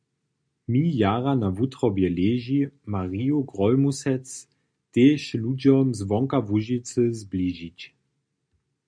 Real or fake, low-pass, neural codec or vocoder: real; 9.9 kHz; none